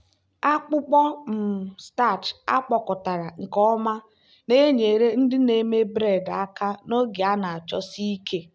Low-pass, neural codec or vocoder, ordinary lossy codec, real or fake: none; none; none; real